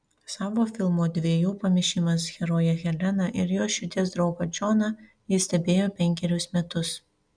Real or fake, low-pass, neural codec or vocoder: real; 9.9 kHz; none